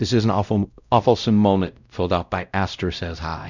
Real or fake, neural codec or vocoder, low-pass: fake; codec, 16 kHz, 0.5 kbps, X-Codec, WavLM features, trained on Multilingual LibriSpeech; 7.2 kHz